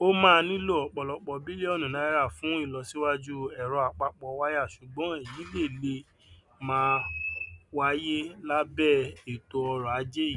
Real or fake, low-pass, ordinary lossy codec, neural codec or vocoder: real; 10.8 kHz; none; none